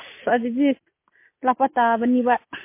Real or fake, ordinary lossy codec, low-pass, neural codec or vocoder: real; MP3, 24 kbps; 3.6 kHz; none